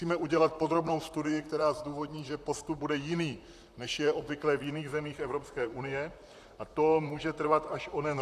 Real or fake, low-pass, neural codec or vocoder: fake; 14.4 kHz; vocoder, 44.1 kHz, 128 mel bands, Pupu-Vocoder